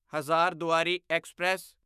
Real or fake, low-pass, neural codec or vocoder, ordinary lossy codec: fake; 14.4 kHz; autoencoder, 48 kHz, 128 numbers a frame, DAC-VAE, trained on Japanese speech; none